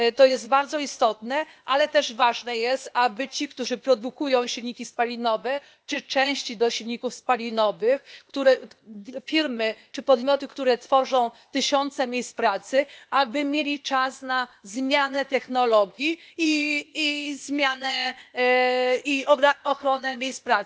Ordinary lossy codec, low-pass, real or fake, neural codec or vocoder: none; none; fake; codec, 16 kHz, 0.8 kbps, ZipCodec